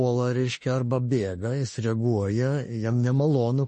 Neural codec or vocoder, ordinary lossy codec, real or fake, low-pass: autoencoder, 48 kHz, 32 numbers a frame, DAC-VAE, trained on Japanese speech; MP3, 32 kbps; fake; 10.8 kHz